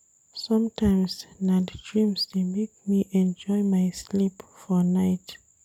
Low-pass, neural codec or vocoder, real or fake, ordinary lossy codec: 19.8 kHz; none; real; none